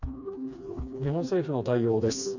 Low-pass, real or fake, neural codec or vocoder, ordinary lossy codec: 7.2 kHz; fake; codec, 16 kHz, 2 kbps, FreqCodec, smaller model; none